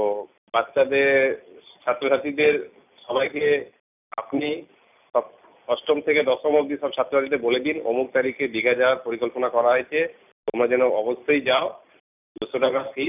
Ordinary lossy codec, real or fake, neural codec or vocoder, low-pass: none; real; none; 3.6 kHz